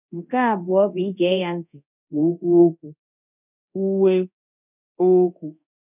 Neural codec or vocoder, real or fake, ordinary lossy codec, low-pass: codec, 24 kHz, 0.9 kbps, DualCodec; fake; none; 3.6 kHz